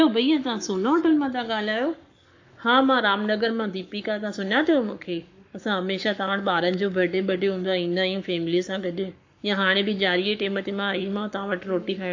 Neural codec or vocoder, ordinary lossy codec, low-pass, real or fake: codec, 44.1 kHz, 7.8 kbps, Pupu-Codec; AAC, 48 kbps; 7.2 kHz; fake